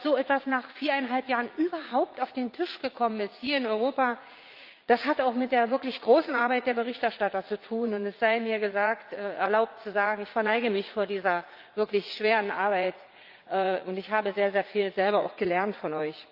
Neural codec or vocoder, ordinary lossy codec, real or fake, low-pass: vocoder, 44.1 kHz, 80 mel bands, Vocos; Opus, 24 kbps; fake; 5.4 kHz